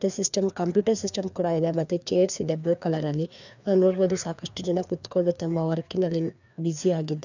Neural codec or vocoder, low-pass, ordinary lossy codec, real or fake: codec, 16 kHz, 2 kbps, FreqCodec, larger model; 7.2 kHz; none; fake